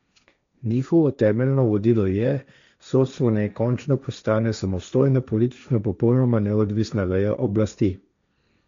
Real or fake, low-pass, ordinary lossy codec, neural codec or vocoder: fake; 7.2 kHz; MP3, 64 kbps; codec, 16 kHz, 1.1 kbps, Voila-Tokenizer